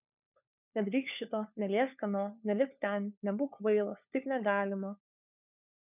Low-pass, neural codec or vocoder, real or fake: 3.6 kHz; codec, 16 kHz, 4 kbps, FunCodec, trained on LibriTTS, 50 frames a second; fake